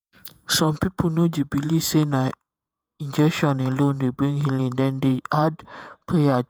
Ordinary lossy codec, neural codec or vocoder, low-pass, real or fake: none; vocoder, 48 kHz, 128 mel bands, Vocos; none; fake